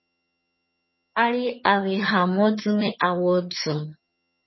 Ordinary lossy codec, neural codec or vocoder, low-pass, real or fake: MP3, 24 kbps; vocoder, 22.05 kHz, 80 mel bands, HiFi-GAN; 7.2 kHz; fake